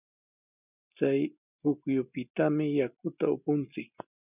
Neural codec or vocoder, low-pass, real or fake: none; 3.6 kHz; real